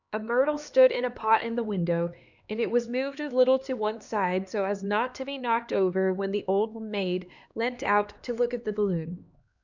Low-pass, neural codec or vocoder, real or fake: 7.2 kHz; codec, 16 kHz, 2 kbps, X-Codec, HuBERT features, trained on LibriSpeech; fake